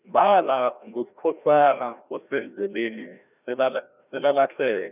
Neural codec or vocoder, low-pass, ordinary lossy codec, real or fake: codec, 16 kHz, 1 kbps, FreqCodec, larger model; 3.6 kHz; none; fake